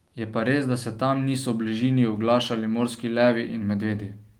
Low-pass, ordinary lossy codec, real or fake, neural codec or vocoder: 19.8 kHz; Opus, 24 kbps; fake; autoencoder, 48 kHz, 128 numbers a frame, DAC-VAE, trained on Japanese speech